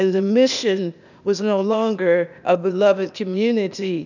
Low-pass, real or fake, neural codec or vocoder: 7.2 kHz; fake; codec, 16 kHz, 0.8 kbps, ZipCodec